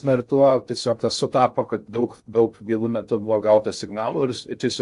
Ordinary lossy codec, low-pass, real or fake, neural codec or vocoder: AAC, 64 kbps; 10.8 kHz; fake; codec, 16 kHz in and 24 kHz out, 0.6 kbps, FocalCodec, streaming, 2048 codes